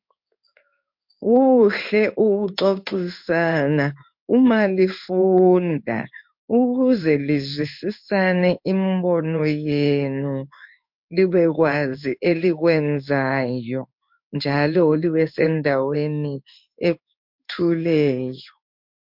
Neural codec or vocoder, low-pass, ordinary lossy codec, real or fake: codec, 16 kHz in and 24 kHz out, 1 kbps, XY-Tokenizer; 5.4 kHz; MP3, 48 kbps; fake